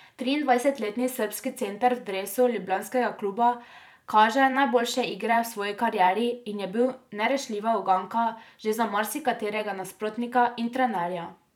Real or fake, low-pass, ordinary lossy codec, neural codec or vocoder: fake; 19.8 kHz; none; vocoder, 44.1 kHz, 128 mel bands every 512 samples, BigVGAN v2